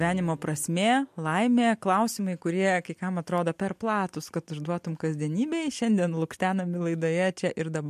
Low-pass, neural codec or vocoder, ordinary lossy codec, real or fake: 14.4 kHz; none; MP3, 64 kbps; real